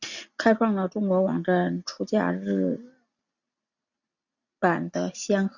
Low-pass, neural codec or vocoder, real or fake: 7.2 kHz; none; real